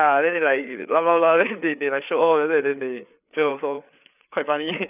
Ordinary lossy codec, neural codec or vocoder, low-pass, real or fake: none; codec, 16 kHz, 4 kbps, FreqCodec, larger model; 3.6 kHz; fake